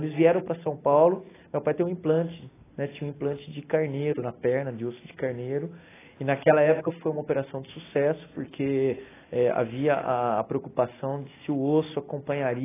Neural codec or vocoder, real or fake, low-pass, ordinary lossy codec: none; real; 3.6 kHz; AAC, 16 kbps